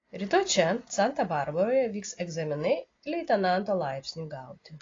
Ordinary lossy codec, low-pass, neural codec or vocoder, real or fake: AAC, 32 kbps; 7.2 kHz; none; real